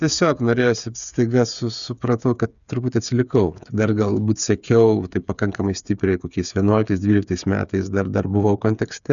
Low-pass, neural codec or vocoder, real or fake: 7.2 kHz; codec, 16 kHz, 8 kbps, FreqCodec, smaller model; fake